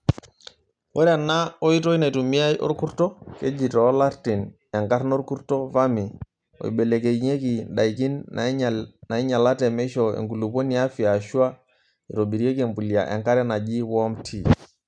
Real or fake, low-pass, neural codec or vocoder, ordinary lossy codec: real; 9.9 kHz; none; none